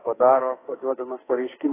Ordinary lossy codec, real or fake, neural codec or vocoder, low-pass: AAC, 16 kbps; fake; codec, 44.1 kHz, 2.6 kbps, SNAC; 3.6 kHz